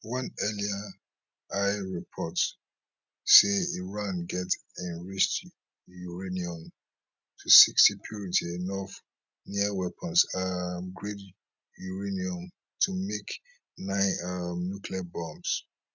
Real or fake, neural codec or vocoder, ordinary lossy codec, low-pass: real; none; none; 7.2 kHz